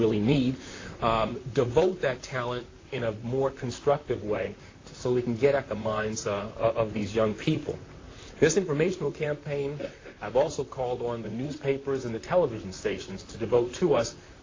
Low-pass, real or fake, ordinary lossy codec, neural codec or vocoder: 7.2 kHz; fake; AAC, 32 kbps; vocoder, 44.1 kHz, 128 mel bands, Pupu-Vocoder